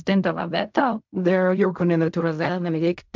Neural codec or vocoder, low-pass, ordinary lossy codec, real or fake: codec, 16 kHz in and 24 kHz out, 0.4 kbps, LongCat-Audio-Codec, fine tuned four codebook decoder; 7.2 kHz; MP3, 64 kbps; fake